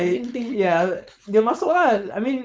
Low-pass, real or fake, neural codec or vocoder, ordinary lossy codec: none; fake; codec, 16 kHz, 4.8 kbps, FACodec; none